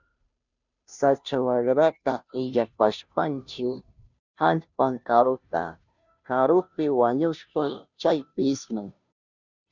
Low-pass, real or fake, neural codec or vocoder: 7.2 kHz; fake; codec, 16 kHz, 0.5 kbps, FunCodec, trained on Chinese and English, 25 frames a second